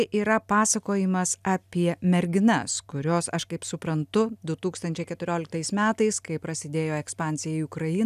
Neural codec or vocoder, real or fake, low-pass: none; real; 14.4 kHz